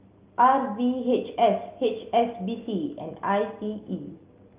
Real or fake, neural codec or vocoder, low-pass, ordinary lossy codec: real; none; 3.6 kHz; Opus, 24 kbps